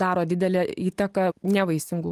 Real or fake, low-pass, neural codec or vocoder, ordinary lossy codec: real; 14.4 kHz; none; Opus, 32 kbps